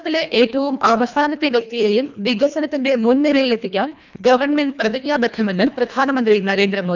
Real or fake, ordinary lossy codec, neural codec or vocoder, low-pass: fake; none; codec, 24 kHz, 1.5 kbps, HILCodec; 7.2 kHz